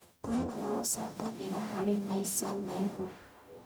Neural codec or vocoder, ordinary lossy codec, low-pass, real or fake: codec, 44.1 kHz, 0.9 kbps, DAC; none; none; fake